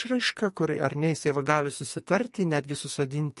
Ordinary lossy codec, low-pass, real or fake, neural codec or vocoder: MP3, 48 kbps; 14.4 kHz; fake; codec, 44.1 kHz, 2.6 kbps, SNAC